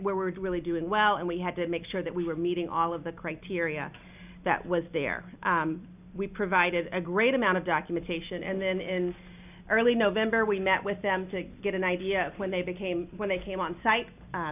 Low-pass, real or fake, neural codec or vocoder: 3.6 kHz; real; none